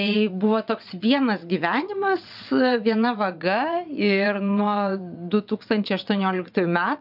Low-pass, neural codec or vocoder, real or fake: 5.4 kHz; vocoder, 22.05 kHz, 80 mel bands, WaveNeXt; fake